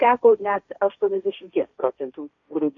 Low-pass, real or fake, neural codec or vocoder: 7.2 kHz; fake; codec, 16 kHz, 1.1 kbps, Voila-Tokenizer